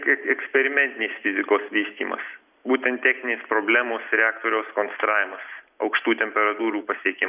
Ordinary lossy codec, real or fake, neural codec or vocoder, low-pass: Opus, 64 kbps; real; none; 3.6 kHz